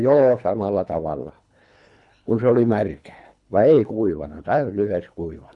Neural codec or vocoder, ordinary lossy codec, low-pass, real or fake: codec, 24 kHz, 3 kbps, HILCodec; none; 10.8 kHz; fake